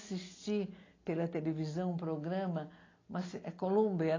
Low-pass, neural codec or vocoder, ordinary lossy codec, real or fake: 7.2 kHz; none; AAC, 32 kbps; real